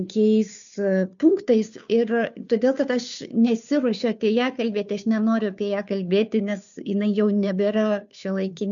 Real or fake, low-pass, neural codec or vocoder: fake; 7.2 kHz; codec, 16 kHz, 2 kbps, FunCodec, trained on Chinese and English, 25 frames a second